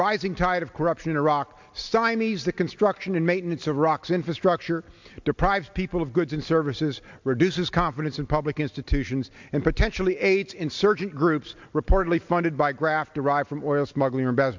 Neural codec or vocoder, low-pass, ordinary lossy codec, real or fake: none; 7.2 kHz; MP3, 64 kbps; real